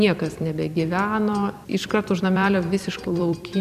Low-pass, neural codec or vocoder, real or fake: 14.4 kHz; vocoder, 44.1 kHz, 128 mel bands every 256 samples, BigVGAN v2; fake